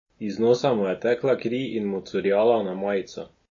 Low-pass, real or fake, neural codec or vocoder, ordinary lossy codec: 7.2 kHz; real; none; MP3, 32 kbps